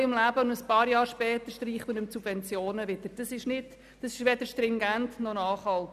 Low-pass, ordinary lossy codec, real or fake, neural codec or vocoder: 14.4 kHz; none; real; none